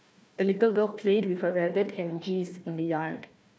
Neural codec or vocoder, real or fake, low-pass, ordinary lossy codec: codec, 16 kHz, 1 kbps, FunCodec, trained on Chinese and English, 50 frames a second; fake; none; none